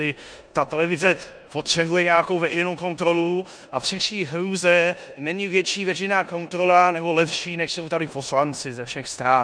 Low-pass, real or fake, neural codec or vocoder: 9.9 kHz; fake; codec, 16 kHz in and 24 kHz out, 0.9 kbps, LongCat-Audio-Codec, four codebook decoder